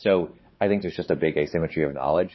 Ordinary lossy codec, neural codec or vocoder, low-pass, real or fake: MP3, 24 kbps; codec, 16 kHz, 4 kbps, X-Codec, WavLM features, trained on Multilingual LibriSpeech; 7.2 kHz; fake